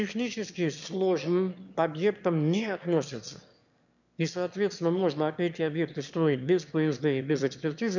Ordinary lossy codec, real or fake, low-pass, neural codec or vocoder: none; fake; 7.2 kHz; autoencoder, 22.05 kHz, a latent of 192 numbers a frame, VITS, trained on one speaker